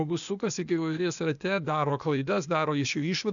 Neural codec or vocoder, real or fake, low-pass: codec, 16 kHz, 0.8 kbps, ZipCodec; fake; 7.2 kHz